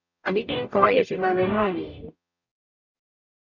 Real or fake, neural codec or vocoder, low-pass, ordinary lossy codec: fake; codec, 44.1 kHz, 0.9 kbps, DAC; 7.2 kHz; none